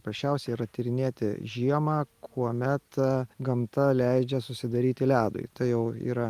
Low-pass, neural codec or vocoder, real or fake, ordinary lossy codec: 14.4 kHz; none; real; Opus, 32 kbps